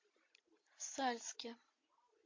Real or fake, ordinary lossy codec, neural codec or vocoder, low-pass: real; MP3, 32 kbps; none; 7.2 kHz